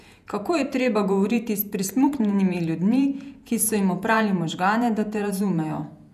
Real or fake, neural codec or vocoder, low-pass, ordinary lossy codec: fake; vocoder, 48 kHz, 128 mel bands, Vocos; 14.4 kHz; none